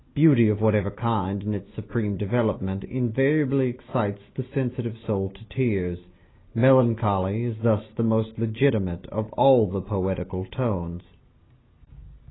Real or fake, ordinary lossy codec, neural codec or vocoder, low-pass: real; AAC, 16 kbps; none; 7.2 kHz